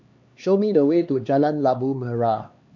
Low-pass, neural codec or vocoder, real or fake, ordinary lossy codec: 7.2 kHz; codec, 16 kHz, 2 kbps, X-Codec, HuBERT features, trained on LibriSpeech; fake; MP3, 48 kbps